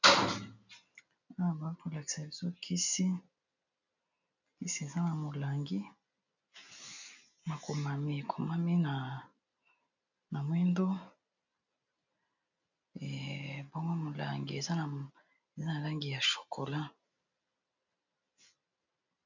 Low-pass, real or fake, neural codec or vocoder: 7.2 kHz; real; none